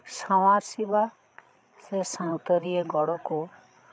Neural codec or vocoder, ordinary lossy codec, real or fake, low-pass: codec, 16 kHz, 8 kbps, FreqCodec, larger model; none; fake; none